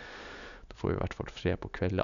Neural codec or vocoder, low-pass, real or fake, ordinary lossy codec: none; 7.2 kHz; real; none